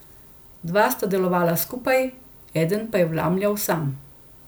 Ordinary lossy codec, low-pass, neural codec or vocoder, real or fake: none; none; none; real